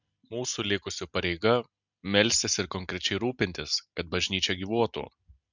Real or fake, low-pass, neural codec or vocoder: real; 7.2 kHz; none